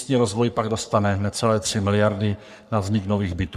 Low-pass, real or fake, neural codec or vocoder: 14.4 kHz; fake; codec, 44.1 kHz, 3.4 kbps, Pupu-Codec